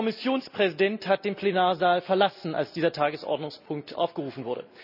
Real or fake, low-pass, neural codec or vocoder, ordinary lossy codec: real; 5.4 kHz; none; none